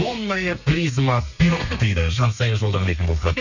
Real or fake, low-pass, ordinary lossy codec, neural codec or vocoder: fake; 7.2 kHz; none; codec, 32 kHz, 1.9 kbps, SNAC